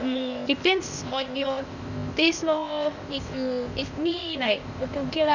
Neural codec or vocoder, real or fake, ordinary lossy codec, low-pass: codec, 16 kHz, 0.8 kbps, ZipCodec; fake; none; 7.2 kHz